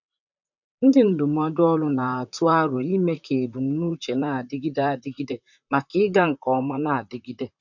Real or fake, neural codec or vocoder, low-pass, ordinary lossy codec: fake; vocoder, 44.1 kHz, 128 mel bands, Pupu-Vocoder; 7.2 kHz; none